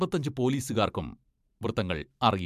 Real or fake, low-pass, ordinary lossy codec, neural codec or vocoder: fake; 14.4 kHz; MP3, 96 kbps; vocoder, 44.1 kHz, 128 mel bands every 512 samples, BigVGAN v2